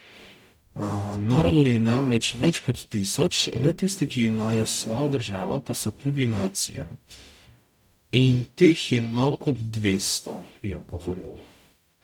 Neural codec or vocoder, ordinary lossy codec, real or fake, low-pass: codec, 44.1 kHz, 0.9 kbps, DAC; none; fake; 19.8 kHz